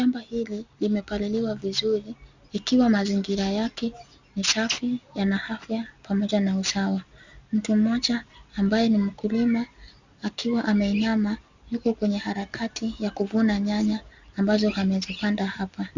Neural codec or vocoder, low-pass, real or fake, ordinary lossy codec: none; 7.2 kHz; real; Opus, 64 kbps